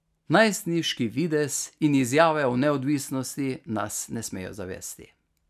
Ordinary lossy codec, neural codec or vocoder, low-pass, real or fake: none; none; 14.4 kHz; real